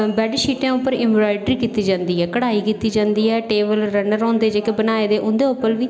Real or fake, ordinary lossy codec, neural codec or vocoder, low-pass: real; none; none; none